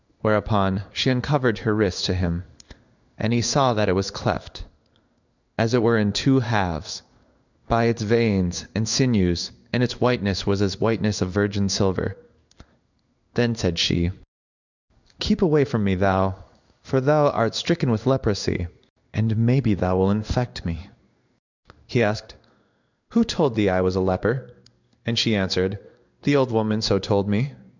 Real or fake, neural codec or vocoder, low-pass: fake; codec, 16 kHz in and 24 kHz out, 1 kbps, XY-Tokenizer; 7.2 kHz